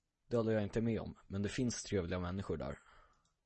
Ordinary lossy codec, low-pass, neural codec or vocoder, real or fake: MP3, 32 kbps; 10.8 kHz; none; real